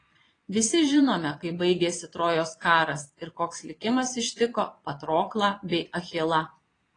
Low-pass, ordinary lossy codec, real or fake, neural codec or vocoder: 9.9 kHz; AAC, 32 kbps; fake; vocoder, 22.05 kHz, 80 mel bands, Vocos